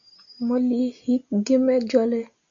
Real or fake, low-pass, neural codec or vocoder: real; 7.2 kHz; none